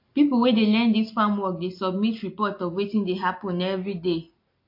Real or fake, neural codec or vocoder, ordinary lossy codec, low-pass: real; none; MP3, 32 kbps; 5.4 kHz